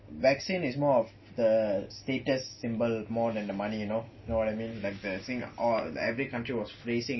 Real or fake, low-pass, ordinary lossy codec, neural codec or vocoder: real; 7.2 kHz; MP3, 24 kbps; none